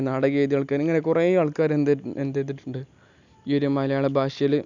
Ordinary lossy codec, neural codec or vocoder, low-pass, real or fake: none; none; 7.2 kHz; real